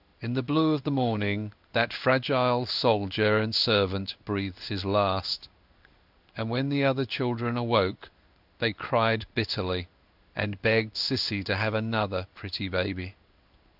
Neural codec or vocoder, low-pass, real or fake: codec, 16 kHz in and 24 kHz out, 1 kbps, XY-Tokenizer; 5.4 kHz; fake